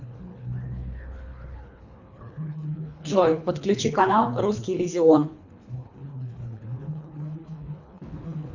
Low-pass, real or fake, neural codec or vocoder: 7.2 kHz; fake; codec, 24 kHz, 3 kbps, HILCodec